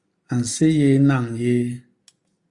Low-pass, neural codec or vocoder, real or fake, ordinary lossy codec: 10.8 kHz; none; real; Opus, 64 kbps